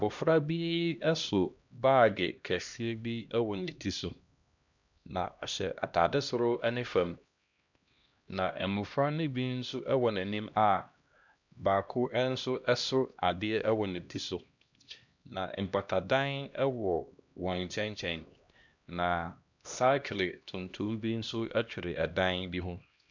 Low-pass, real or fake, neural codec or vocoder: 7.2 kHz; fake; codec, 16 kHz, 1 kbps, X-Codec, HuBERT features, trained on LibriSpeech